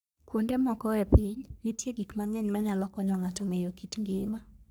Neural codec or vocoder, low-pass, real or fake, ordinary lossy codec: codec, 44.1 kHz, 3.4 kbps, Pupu-Codec; none; fake; none